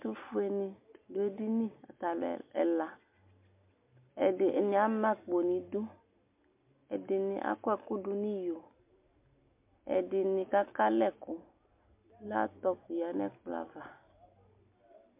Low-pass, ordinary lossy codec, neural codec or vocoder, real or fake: 3.6 kHz; MP3, 32 kbps; none; real